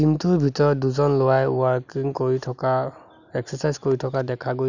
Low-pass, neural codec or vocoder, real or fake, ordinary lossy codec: 7.2 kHz; none; real; none